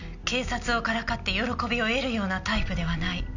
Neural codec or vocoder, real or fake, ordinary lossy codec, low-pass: none; real; none; 7.2 kHz